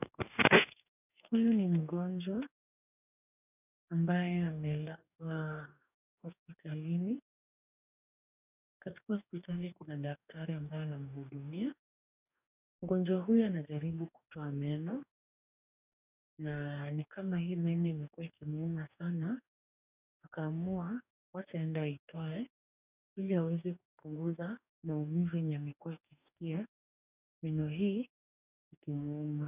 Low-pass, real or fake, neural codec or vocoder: 3.6 kHz; fake; codec, 44.1 kHz, 2.6 kbps, DAC